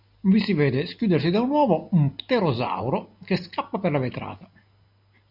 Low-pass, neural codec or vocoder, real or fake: 5.4 kHz; none; real